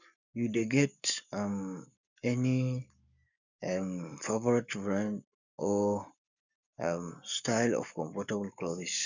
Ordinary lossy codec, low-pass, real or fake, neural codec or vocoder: none; 7.2 kHz; fake; codec, 44.1 kHz, 7.8 kbps, Pupu-Codec